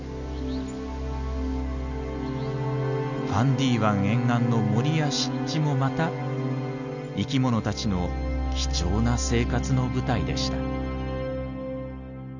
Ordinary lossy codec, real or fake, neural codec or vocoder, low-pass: none; real; none; 7.2 kHz